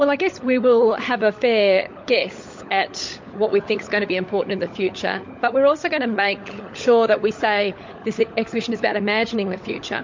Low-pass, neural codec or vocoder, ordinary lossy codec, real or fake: 7.2 kHz; codec, 16 kHz, 16 kbps, FunCodec, trained on LibriTTS, 50 frames a second; MP3, 48 kbps; fake